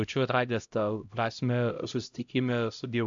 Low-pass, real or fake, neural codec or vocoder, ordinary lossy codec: 7.2 kHz; fake; codec, 16 kHz, 0.5 kbps, X-Codec, HuBERT features, trained on LibriSpeech; AAC, 64 kbps